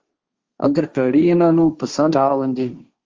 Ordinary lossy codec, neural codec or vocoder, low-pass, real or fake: Opus, 32 kbps; codec, 16 kHz, 1.1 kbps, Voila-Tokenizer; 7.2 kHz; fake